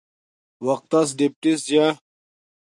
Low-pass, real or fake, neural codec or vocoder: 10.8 kHz; real; none